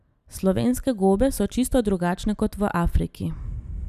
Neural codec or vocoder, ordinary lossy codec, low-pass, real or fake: none; none; 14.4 kHz; real